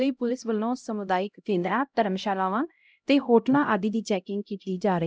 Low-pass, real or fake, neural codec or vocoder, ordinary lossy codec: none; fake; codec, 16 kHz, 0.5 kbps, X-Codec, HuBERT features, trained on LibriSpeech; none